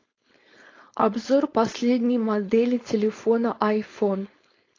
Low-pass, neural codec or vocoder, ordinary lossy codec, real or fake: 7.2 kHz; codec, 16 kHz, 4.8 kbps, FACodec; AAC, 32 kbps; fake